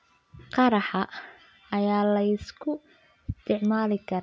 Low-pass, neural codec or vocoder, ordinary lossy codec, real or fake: none; none; none; real